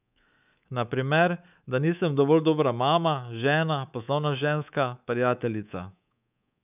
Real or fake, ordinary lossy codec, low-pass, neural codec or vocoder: fake; none; 3.6 kHz; codec, 24 kHz, 3.1 kbps, DualCodec